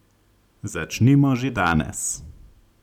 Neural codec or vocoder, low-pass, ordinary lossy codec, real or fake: none; 19.8 kHz; none; real